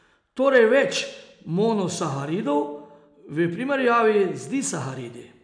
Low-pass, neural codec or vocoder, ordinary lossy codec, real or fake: 9.9 kHz; none; none; real